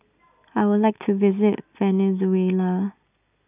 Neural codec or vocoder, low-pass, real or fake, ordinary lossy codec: none; 3.6 kHz; real; none